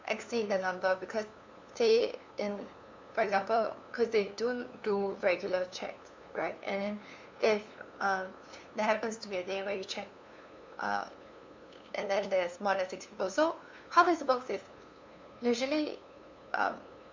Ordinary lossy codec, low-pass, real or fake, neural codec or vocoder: MP3, 64 kbps; 7.2 kHz; fake; codec, 16 kHz, 2 kbps, FunCodec, trained on LibriTTS, 25 frames a second